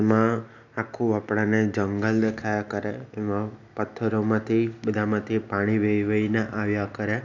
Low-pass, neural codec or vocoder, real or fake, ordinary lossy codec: 7.2 kHz; none; real; none